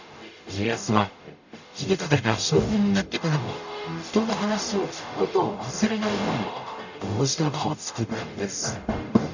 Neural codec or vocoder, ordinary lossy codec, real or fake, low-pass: codec, 44.1 kHz, 0.9 kbps, DAC; none; fake; 7.2 kHz